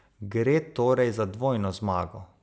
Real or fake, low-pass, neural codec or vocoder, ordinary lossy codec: real; none; none; none